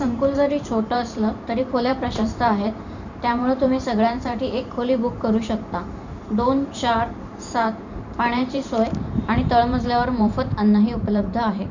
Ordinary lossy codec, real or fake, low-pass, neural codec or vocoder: none; fake; 7.2 kHz; vocoder, 44.1 kHz, 128 mel bands every 512 samples, BigVGAN v2